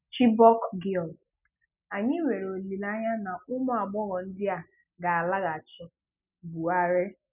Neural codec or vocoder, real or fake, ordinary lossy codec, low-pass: none; real; none; 3.6 kHz